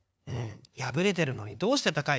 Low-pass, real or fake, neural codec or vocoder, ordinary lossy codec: none; fake; codec, 16 kHz, 2 kbps, FunCodec, trained on LibriTTS, 25 frames a second; none